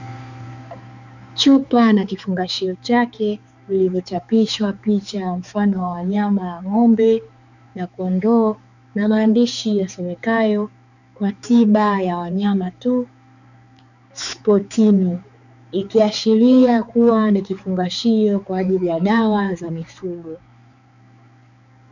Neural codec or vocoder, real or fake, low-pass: codec, 16 kHz, 4 kbps, X-Codec, HuBERT features, trained on balanced general audio; fake; 7.2 kHz